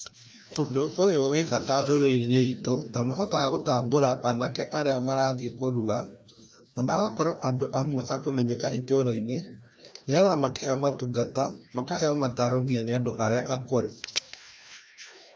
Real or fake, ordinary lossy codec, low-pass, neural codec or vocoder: fake; none; none; codec, 16 kHz, 1 kbps, FreqCodec, larger model